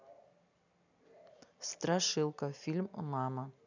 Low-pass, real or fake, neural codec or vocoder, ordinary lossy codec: 7.2 kHz; real; none; none